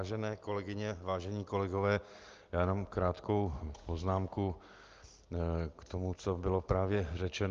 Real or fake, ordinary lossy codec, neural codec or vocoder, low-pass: real; Opus, 32 kbps; none; 7.2 kHz